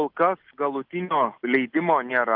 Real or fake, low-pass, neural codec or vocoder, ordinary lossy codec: real; 5.4 kHz; none; Opus, 24 kbps